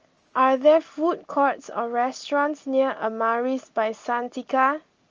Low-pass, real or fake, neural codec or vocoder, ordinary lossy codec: 7.2 kHz; real; none; Opus, 24 kbps